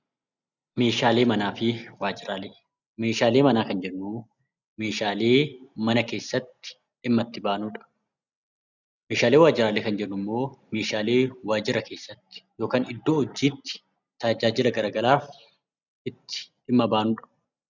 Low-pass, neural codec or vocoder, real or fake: 7.2 kHz; none; real